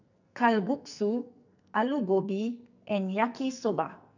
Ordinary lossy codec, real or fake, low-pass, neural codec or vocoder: none; fake; 7.2 kHz; codec, 44.1 kHz, 2.6 kbps, SNAC